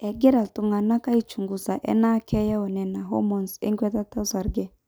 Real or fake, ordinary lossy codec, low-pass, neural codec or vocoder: real; none; none; none